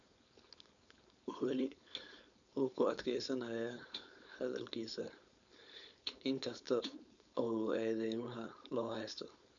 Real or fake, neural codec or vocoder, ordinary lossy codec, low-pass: fake; codec, 16 kHz, 4.8 kbps, FACodec; none; 7.2 kHz